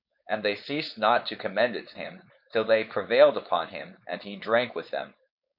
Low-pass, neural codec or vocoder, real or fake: 5.4 kHz; codec, 16 kHz, 4.8 kbps, FACodec; fake